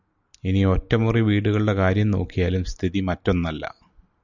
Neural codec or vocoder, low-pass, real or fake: none; 7.2 kHz; real